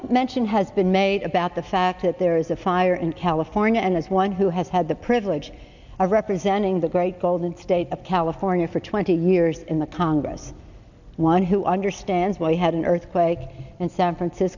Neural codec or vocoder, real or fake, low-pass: none; real; 7.2 kHz